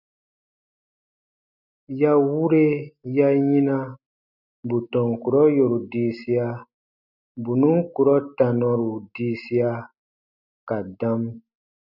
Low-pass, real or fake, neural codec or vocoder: 5.4 kHz; real; none